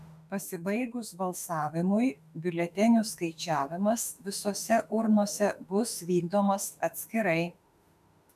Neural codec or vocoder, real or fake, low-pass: autoencoder, 48 kHz, 32 numbers a frame, DAC-VAE, trained on Japanese speech; fake; 14.4 kHz